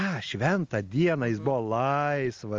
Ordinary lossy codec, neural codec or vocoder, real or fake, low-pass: Opus, 32 kbps; none; real; 7.2 kHz